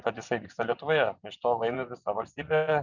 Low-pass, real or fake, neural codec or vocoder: 7.2 kHz; fake; vocoder, 24 kHz, 100 mel bands, Vocos